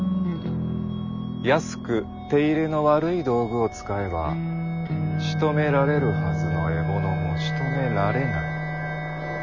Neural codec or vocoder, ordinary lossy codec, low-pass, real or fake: none; none; 7.2 kHz; real